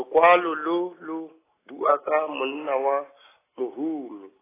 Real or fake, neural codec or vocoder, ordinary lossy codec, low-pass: real; none; AAC, 16 kbps; 3.6 kHz